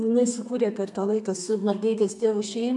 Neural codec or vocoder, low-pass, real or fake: codec, 32 kHz, 1.9 kbps, SNAC; 10.8 kHz; fake